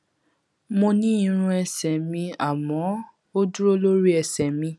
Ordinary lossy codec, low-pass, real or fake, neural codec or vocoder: none; none; real; none